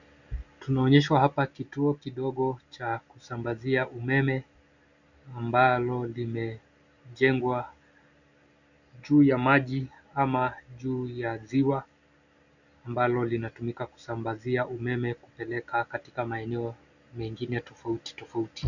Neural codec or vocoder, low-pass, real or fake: none; 7.2 kHz; real